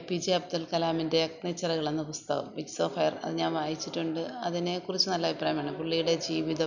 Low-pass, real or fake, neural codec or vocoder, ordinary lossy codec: 7.2 kHz; real; none; none